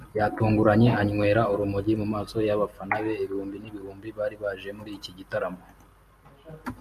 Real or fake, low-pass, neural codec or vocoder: real; 14.4 kHz; none